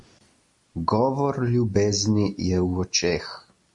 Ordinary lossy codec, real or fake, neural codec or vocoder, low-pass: MP3, 48 kbps; real; none; 10.8 kHz